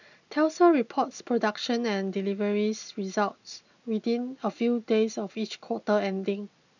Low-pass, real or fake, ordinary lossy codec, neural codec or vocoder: 7.2 kHz; real; none; none